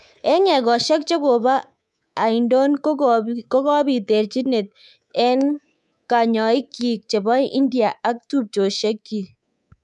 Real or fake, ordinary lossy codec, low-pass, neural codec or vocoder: fake; none; none; codec, 24 kHz, 3.1 kbps, DualCodec